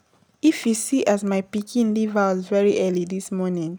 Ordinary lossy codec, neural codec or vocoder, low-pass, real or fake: none; none; none; real